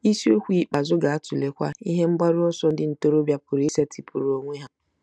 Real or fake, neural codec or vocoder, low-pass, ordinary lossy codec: real; none; none; none